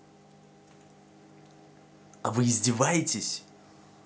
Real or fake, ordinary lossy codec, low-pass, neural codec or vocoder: real; none; none; none